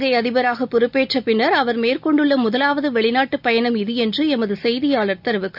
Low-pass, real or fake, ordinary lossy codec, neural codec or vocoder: 5.4 kHz; real; none; none